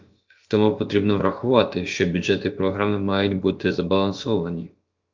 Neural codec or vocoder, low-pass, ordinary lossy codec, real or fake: codec, 16 kHz, about 1 kbps, DyCAST, with the encoder's durations; 7.2 kHz; Opus, 32 kbps; fake